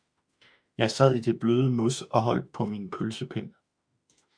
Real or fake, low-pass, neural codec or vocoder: fake; 9.9 kHz; autoencoder, 48 kHz, 32 numbers a frame, DAC-VAE, trained on Japanese speech